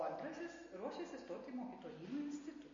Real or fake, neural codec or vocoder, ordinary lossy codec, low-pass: real; none; MP3, 32 kbps; 7.2 kHz